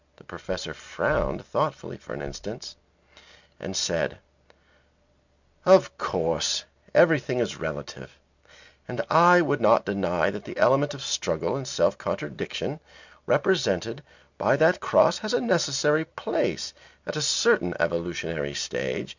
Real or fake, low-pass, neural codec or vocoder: real; 7.2 kHz; none